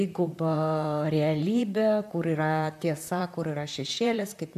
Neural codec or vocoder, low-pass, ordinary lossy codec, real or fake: vocoder, 44.1 kHz, 128 mel bands, Pupu-Vocoder; 14.4 kHz; AAC, 96 kbps; fake